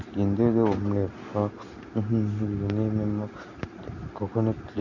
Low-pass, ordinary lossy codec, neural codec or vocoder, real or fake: 7.2 kHz; none; none; real